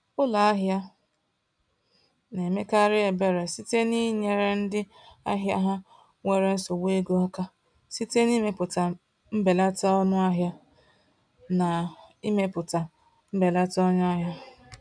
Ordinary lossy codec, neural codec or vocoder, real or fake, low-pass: none; none; real; 9.9 kHz